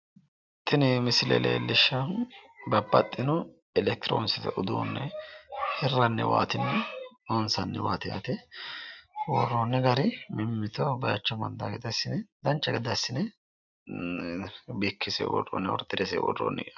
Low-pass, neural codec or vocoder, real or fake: 7.2 kHz; none; real